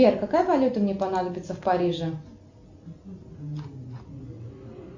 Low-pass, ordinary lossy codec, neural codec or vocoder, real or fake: 7.2 kHz; Opus, 64 kbps; none; real